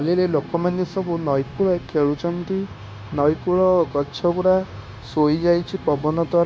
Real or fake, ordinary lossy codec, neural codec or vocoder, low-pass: fake; none; codec, 16 kHz, 0.9 kbps, LongCat-Audio-Codec; none